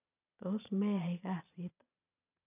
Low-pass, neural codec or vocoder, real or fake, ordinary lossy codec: 3.6 kHz; vocoder, 22.05 kHz, 80 mel bands, Vocos; fake; none